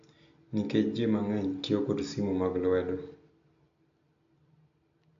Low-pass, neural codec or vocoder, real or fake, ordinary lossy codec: 7.2 kHz; none; real; MP3, 64 kbps